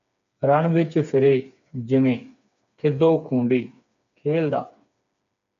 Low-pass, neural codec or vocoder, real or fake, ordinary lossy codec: 7.2 kHz; codec, 16 kHz, 4 kbps, FreqCodec, smaller model; fake; MP3, 64 kbps